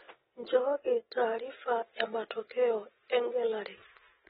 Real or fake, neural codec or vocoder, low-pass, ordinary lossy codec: real; none; 7.2 kHz; AAC, 16 kbps